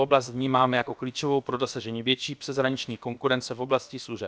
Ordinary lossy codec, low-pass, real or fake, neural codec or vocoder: none; none; fake; codec, 16 kHz, about 1 kbps, DyCAST, with the encoder's durations